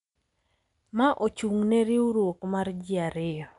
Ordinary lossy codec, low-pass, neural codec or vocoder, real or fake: none; 10.8 kHz; none; real